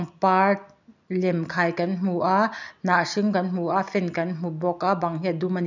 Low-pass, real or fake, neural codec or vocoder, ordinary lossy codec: 7.2 kHz; real; none; none